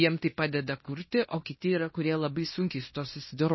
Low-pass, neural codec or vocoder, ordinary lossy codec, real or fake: 7.2 kHz; codec, 16 kHz in and 24 kHz out, 0.9 kbps, LongCat-Audio-Codec, fine tuned four codebook decoder; MP3, 24 kbps; fake